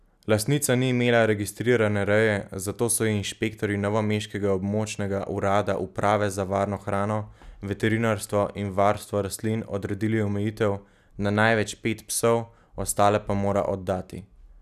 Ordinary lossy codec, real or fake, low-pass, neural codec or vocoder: none; real; 14.4 kHz; none